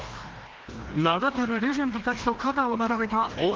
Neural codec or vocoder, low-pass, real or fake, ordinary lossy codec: codec, 16 kHz, 1 kbps, FreqCodec, larger model; 7.2 kHz; fake; Opus, 16 kbps